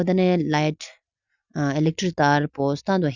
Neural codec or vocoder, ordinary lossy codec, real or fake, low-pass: none; Opus, 64 kbps; real; 7.2 kHz